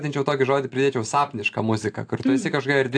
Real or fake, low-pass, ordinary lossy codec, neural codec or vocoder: real; 9.9 kHz; AAC, 64 kbps; none